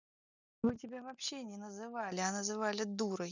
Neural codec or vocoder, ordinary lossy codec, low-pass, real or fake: none; none; 7.2 kHz; real